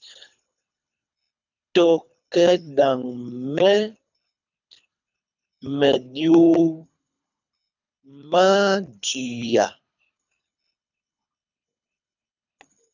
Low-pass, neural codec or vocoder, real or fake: 7.2 kHz; codec, 24 kHz, 3 kbps, HILCodec; fake